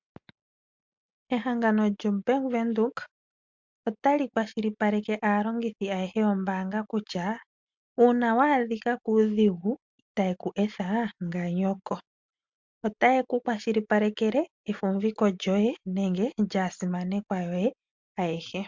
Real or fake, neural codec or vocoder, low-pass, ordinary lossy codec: real; none; 7.2 kHz; MP3, 64 kbps